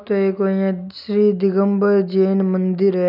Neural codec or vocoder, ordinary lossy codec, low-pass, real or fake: none; none; 5.4 kHz; real